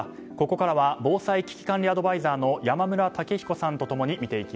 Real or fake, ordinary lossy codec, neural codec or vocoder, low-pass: real; none; none; none